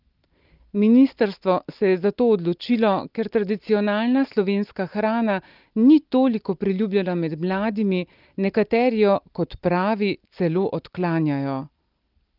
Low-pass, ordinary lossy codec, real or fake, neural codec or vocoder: 5.4 kHz; Opus, 32 kbps; real; none